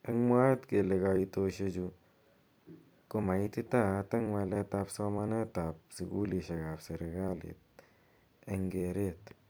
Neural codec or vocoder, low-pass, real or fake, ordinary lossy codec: vocoder, 44.1 kHz, 128 mel bands every 256 samples, BigVGAN v2; none; fake; none